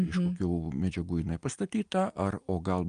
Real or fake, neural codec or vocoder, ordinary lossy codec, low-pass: real; none; Opus, 32 kbps; 9.9 kHz